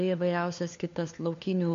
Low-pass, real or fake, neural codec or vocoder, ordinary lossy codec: 7.2 kHz; fake; codec, 16 kHz, 4 kbps, FunCodec, trained on LibriTTS, 50 frames a second; MP3, 48 kbps